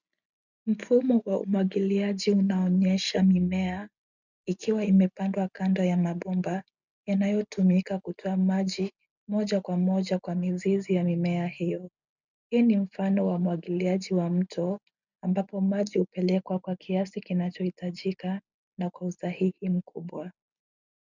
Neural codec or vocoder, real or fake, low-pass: none; real; 7.2 kHz